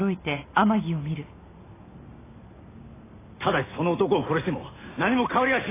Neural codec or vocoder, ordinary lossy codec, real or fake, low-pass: none; AAC, 16 kbps; real; 3.6 kHz